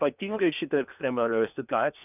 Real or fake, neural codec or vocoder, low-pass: fake; codec, 16 kHz in and 24 kHz out, 0.8 kbps, FocalCodec, streaming, 65536 codes; 3.6 kHz